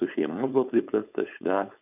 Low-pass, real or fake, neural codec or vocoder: 3.6 kHz; fake; codec, 16 kHz, 4.8 kbps, FACodec